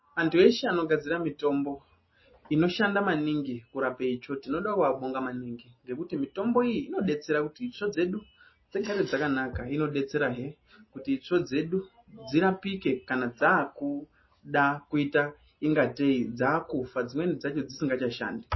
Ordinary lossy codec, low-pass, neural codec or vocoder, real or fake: MP3, 24 kbps; 7.2 kHz; none; real